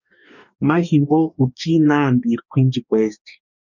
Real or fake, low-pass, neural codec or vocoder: fake; 7.2 kHz; codec, 44.1 kHz, 2.6 kbps, DAC